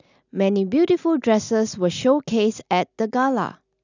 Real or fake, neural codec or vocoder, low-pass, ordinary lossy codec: real; none; 7.2 kHz; none